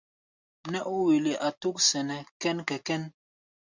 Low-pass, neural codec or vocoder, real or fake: 7.2 kHz; none; real